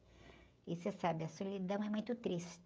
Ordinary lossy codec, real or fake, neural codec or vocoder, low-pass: none; fake; codec, 16 kHz, 16 kbps, FreqCodec, smaller model; none